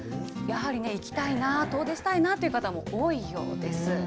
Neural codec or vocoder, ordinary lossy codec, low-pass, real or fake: none; none; none; real